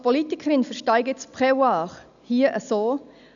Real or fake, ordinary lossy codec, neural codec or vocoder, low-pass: real; none; none; 7.2 kHz